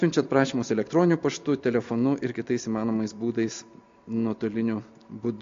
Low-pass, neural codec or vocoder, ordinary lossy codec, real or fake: 7.2 kHz; none; MP3, 48 kbps; real